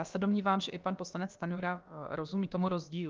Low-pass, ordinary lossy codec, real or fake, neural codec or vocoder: 7.2 kHz; Opus, 24 kbps; fake; codec, 16 kHz, about 1 kbps, DyCAST, with the encoder's durations